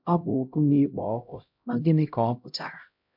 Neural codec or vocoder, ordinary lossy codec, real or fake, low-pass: codec, 16 kHz, 0.5 kbps, X-Codec, HuBERT features, trained on LibriSpeech; MP3, 48 kbps; fake; 5.4 kHz